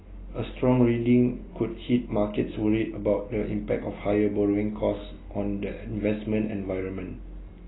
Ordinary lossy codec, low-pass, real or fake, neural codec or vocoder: AAC, 16 kbps; 7.2 kHz; real; none